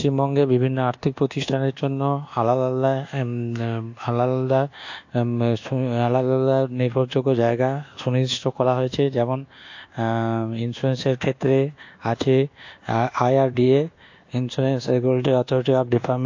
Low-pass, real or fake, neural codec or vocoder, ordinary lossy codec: 7.2 kHz; fake; codec, 24 kHz, 1.2 kbps, DualCodec; AAC, 48 kbps